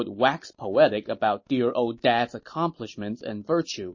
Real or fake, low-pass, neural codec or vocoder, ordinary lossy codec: real; 7.2 kHz; none; MP3, 32 kbps